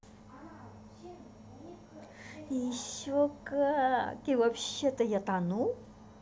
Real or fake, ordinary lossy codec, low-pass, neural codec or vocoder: real; none; none; none